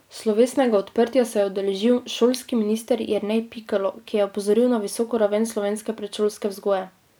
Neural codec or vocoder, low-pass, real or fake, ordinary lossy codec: vocoder, 44.1 kHz, 128 mel bands every 256 samples, BigVGAN v2; none; fake; none